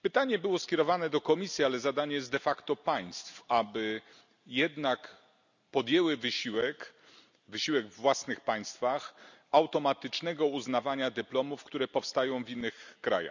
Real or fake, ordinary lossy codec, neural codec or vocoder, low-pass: real; none; none; 7.2 kHz